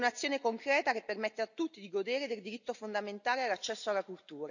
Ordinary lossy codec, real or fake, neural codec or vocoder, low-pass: none; real; none; 7.2 kHz